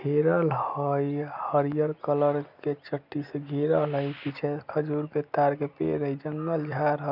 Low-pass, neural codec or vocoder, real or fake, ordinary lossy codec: 5.4 kHz; none; real; none